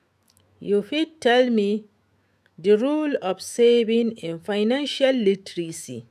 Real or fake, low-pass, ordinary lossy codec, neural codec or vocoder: fake; 14.4 kHz; none; autoencoder, 48 kHz, 128 numbers a frame, DAC-VAE, trained on Japanese speech